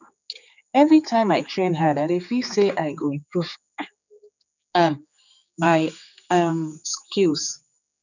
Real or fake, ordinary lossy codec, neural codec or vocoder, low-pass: fake; none; codec, 16 kHz, 4 kbps, X-Codec, HuBERT features, trained on general audio; 7.2 kHz